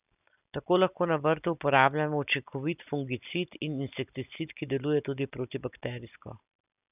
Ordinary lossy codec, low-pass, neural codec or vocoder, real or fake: none; 3.6 kHz; none; real